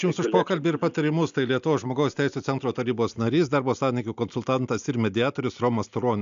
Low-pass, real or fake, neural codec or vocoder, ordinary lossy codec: 7.2 kHz; real; none; AAC, 96 kbps